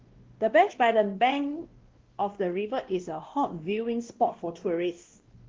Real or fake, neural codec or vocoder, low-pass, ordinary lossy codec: fake; codec, 16 kHz, 1 kbps, X-Codec, WavLM features, trained on Multilingual LibriSpeech; 7.2 kHz; Opus, 16 kbps